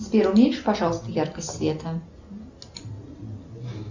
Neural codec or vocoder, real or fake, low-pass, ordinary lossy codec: none; real; 7.2 kHz; Opus, 64 kbps